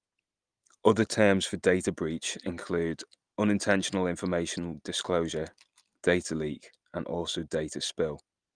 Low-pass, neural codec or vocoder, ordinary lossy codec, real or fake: 9.9 kHz; none; Opus, 32 kbps; real